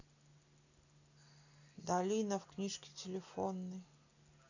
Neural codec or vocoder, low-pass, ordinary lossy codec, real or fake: none; 7.2 kHz; none; real